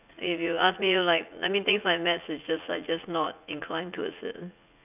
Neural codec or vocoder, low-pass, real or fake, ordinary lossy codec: vocoder, 44.1 kHz, 80 mel bands, Vocos; 3.6 kHz; fake; none